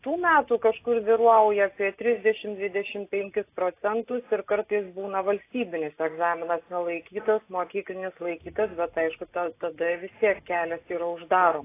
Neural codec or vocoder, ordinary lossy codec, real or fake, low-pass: none; AAC, 24 kbps; real; 3.6 kHz